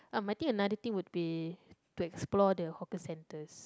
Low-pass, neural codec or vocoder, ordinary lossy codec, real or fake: none; none; none; real